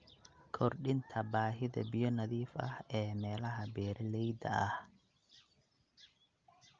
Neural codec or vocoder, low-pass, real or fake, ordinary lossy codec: none; 7.2 kHz; real; Opus, 24 kbps